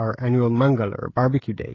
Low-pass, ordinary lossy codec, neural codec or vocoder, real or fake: 7.2 kHz; AAC, 32 kbps; codec, 16 kHz, 16 kbps, FreqCodec, smaller model; fake